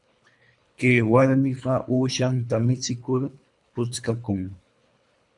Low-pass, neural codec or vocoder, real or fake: 10.8 kHz; codec, 24 kHz, 3 kbps, HILCodec; fake